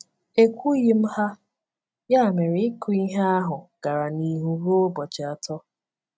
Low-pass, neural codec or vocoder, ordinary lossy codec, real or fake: none; none; none; real